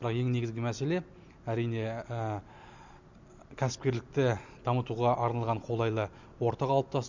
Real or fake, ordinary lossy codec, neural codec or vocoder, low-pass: real; none; none; 7.2 kHz